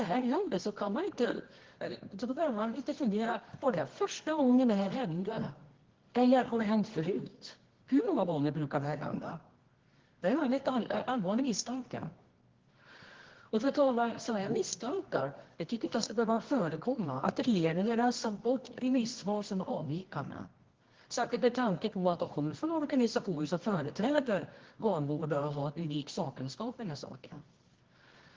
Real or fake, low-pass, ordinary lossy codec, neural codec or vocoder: fake; 7.2 kHz; Opus, 16 kbps; codec, 24 kHz, 0.9 kbps, WavTokenizer, medium music audio release